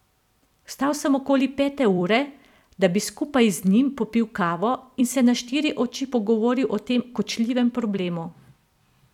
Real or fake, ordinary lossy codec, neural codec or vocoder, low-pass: fake; none; vocoder, 44.1 kHz, 128 mel bands every 256 samples, BigVGAN v2; 19.8 kHz